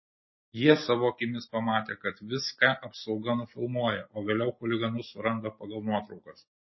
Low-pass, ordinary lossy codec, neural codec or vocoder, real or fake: 7.2 kHz; MP3, 24 kbps; none; real